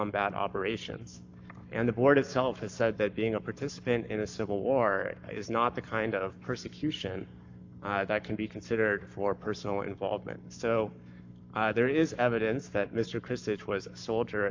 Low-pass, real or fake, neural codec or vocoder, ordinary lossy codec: 7.2 kHz; fake; codec, 44.1 kHz, 7.8 kbps, Pupu-Codec; AAC, 48 kbps